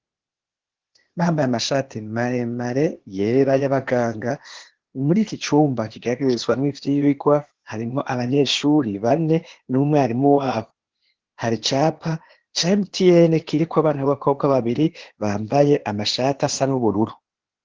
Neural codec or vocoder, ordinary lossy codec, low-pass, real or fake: codec, 16 kHz, 0.8 kbps, ZipCodec; Opus, 16 kbps; 7.2 kHz; fake